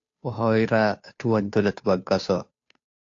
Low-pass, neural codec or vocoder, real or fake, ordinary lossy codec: 7.2 kHz; codec, 16 kHz, 2 kbps, FunCodec, trained on Chinese and English, 25 frames a second; fake; AAC, 48 kbps